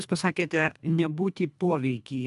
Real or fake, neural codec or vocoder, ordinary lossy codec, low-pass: fake; codec, 24 kHz, 1.5 kbps, HILCodec; AAC, 96 kbps; 10.8 kHz